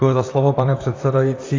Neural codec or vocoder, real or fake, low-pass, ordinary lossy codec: vocoder, 44.1 kHz, 128 mel bands, Pupu-Vocoder; fake; 7.2 kHz; AAC, 32 kbps